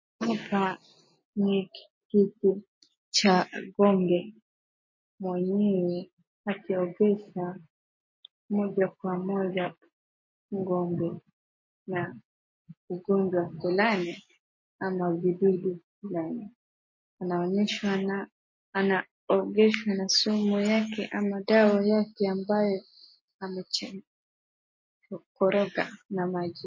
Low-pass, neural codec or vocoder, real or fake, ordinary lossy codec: 7.2 kHz; none; real; MP3, 32 kbps